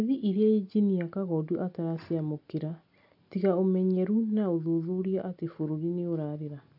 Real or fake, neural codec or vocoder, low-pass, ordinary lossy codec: real; none; 5.4 kHz; AAC, 48 kbps